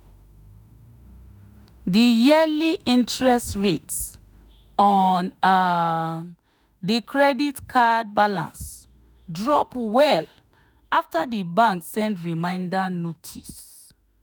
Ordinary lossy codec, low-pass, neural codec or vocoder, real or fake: none; none; autoencoder, 48 kHz, 32 numbers a frame, DAC-VAE, trained on Japanese speech; fake